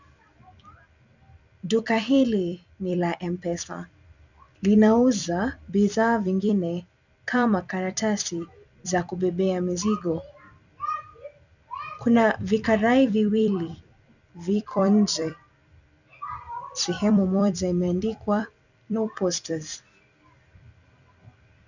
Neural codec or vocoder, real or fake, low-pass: vocoder, 44.1 kHz, 128 mel bands every 256 samples, BigVGAN v2; fake; 7.2 kHz